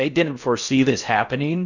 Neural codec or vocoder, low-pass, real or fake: codec, 16 kHz in and 24 kHz out, 0.6 kbps, FocalCodec, streaming, 4096 codes; 7.2 kHz; fake